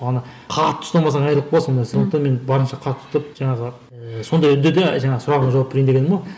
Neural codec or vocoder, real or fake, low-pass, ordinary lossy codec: none; real; none; none